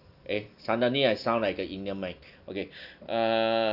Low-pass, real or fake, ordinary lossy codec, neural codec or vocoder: 5.4 kHz; real; none; none